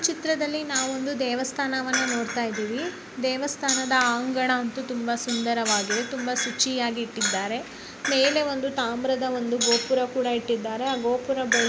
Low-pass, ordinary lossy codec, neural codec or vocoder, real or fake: none; none; none; real